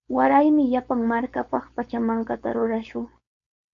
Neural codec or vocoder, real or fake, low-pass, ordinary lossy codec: codec, 16 kHz, 4.8 kbps, FACodec; fake; 7.2 kHz; MP3, 64 kbps